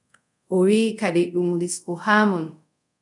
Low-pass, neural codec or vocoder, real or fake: 10.8 kHz; codec, 24 kHz, 0.5 kbps, DualCodec; fake